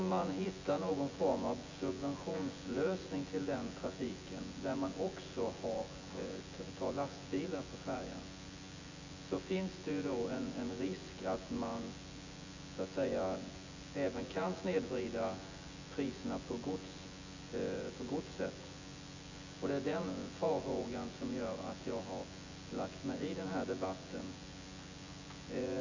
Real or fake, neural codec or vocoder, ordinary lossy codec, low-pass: fake; vocoder, 24 kHz, 100 mel bands, Vocos; AAC, 48 kbps; 7.2 kHz